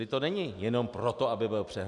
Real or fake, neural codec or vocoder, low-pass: real; none; 10.8 kHz